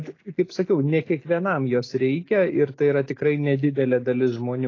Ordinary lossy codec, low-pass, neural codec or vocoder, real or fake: AAC, 32 kbps; 7.2 kHz; none; real